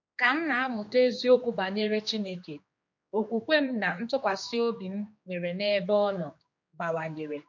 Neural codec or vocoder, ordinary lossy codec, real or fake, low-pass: codec, 16 kHz, 2 kbps, X-Codec, HuBERT features, trained on general audio; MP3, 48 kbps; fake; 7.2 kHz